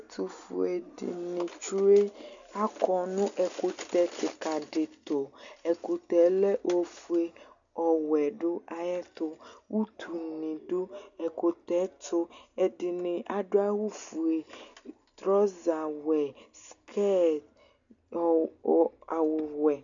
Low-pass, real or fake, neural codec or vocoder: 7.2 kHz; real; none